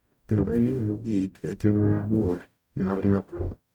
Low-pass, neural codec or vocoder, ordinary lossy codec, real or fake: 19.8 kHz; codec, 44.1 kHz, 0.9 kbps, DAC; none; fake